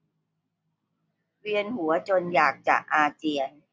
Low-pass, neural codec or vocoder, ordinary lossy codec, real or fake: none; none; none; real